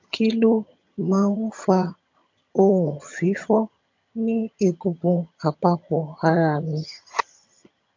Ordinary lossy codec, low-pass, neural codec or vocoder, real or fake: MP3, 48 kbps; 7.2 kHz; vocoder, 22.05 kHz, 80 mel bands, HiFi-GAN; fake